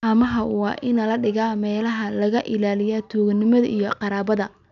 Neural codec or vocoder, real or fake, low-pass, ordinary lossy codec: none; real; 7.2 kHz; none